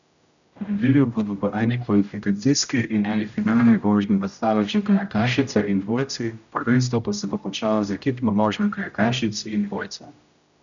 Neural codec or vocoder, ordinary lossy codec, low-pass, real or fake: codec, 16 kHz, 0.5 kbps, X-Codec, HuBERT features, trained on general audio; none; 7.2 kHz; fake